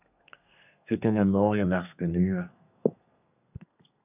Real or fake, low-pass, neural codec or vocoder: fake; 3.6 kHz; codec, 32 kHz, 1.9 kbps, SNAC